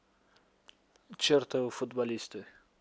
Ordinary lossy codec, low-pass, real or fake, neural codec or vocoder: none; none; real; none